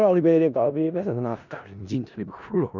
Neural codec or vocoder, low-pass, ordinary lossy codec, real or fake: codec, 16 kHz in and 24 kHz out, 0.4 kbps, LongCat-Audio-Codec, four codebook decoder; 7.2 kHz; none; fake